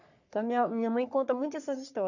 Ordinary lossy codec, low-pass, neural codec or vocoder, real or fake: none; 7.2 kHz; codec, 44.1 kHz, 3.4 kbps, Pupu-Codec; fake